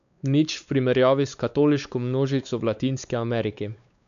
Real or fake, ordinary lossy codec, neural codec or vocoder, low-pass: fake; none; codec, 16 kHz, 4 kbps, X-Codec, WavLM features, trained on Multilingual LibriSpeech; 7.2 kHz